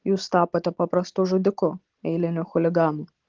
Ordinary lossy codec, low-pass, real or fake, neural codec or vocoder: Opus, 16 kbps; 7.2 kHz; real; none